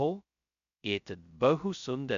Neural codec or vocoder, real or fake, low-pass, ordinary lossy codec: codec, 16 kHz, 0.2 kbps, FocalCodec; fake; 7.2 kHz; MP3, 64 kbps